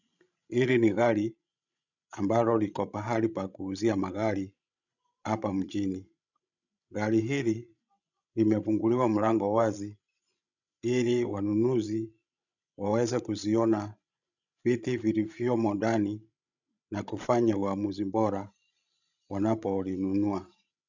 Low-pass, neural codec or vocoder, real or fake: 7.2 kHz; codec, 16 kHz, 16 kbps, FreqCodec, larger model; fake